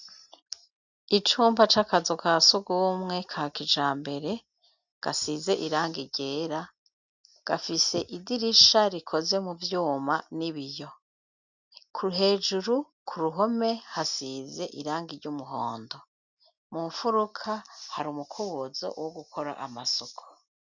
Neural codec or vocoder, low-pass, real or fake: none; 7.2 kHz; real